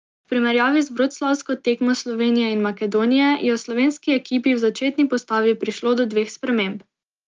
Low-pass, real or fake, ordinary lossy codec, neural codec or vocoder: 7.2 kHz; real; Opus, 32 kbps; none